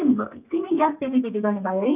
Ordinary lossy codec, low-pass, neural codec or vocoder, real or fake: none; 3.6 kHz; codec, 32 kHz, 1.9 kbps, SNAC; fake